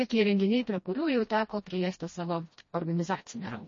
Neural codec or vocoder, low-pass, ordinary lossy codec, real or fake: codec, 16 kHz, 1 kbps, FreqCodec, smaller model; 7.2 kHz; MP3, 32 kbps; fake